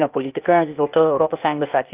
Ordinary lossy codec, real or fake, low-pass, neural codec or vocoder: Opus, 24 kbps; fake; 3.6 kHz; codec, 16 kHz, 0.8 kbps, ZipCodec